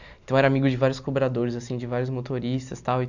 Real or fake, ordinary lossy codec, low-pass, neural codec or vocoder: real; none; 7.2 kHz; none